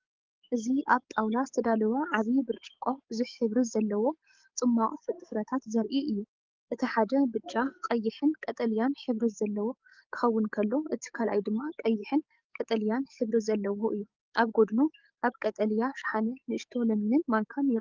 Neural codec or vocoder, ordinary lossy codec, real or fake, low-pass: codec, 44.1 kHz, 7.8 kbps, DAC; Opus, 24 kbps; fake; 7.2 kHz